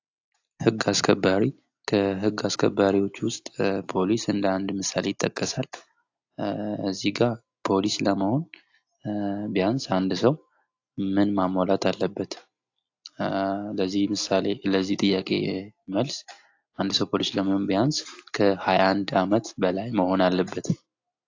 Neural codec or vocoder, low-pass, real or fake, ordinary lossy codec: none; 7.2 kHz; real; AAC, 48 kbps